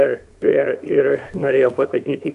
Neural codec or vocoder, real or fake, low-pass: codec, 24 kHz, 0.9 kbps, WavTokenizer, small release; fake; 10.8 kHz